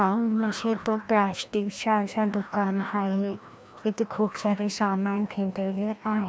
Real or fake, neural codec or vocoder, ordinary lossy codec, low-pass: fake; codec, 16 kHz, 1 kbps, FreqCodec, larger model; none; none